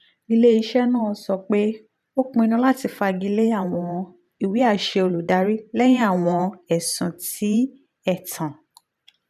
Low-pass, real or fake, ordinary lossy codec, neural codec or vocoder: 14.4 kHz; fake; none; vocoder, 44.1 kHz, 128 mel bands every 512 samples, BigVGAN v2